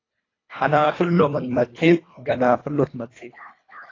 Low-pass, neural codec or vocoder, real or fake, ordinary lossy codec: 7.2 kHz; codec, 24 kHz, 1.5 kbps, HILCodec; fake; AAC, 32 kbps